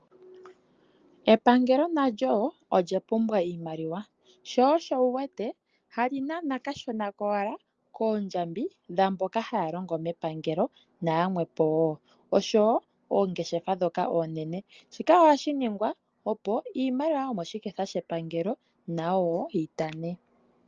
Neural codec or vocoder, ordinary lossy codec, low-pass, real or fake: none; Opus, 24 kbps; 7.2 kHz; real